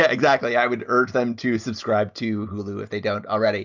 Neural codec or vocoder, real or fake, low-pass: none; real; 7.2 kHz